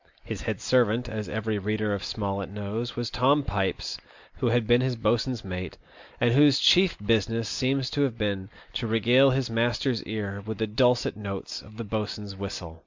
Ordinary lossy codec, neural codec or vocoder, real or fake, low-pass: MP3, 64 kbps; none; real; 7.2 kHz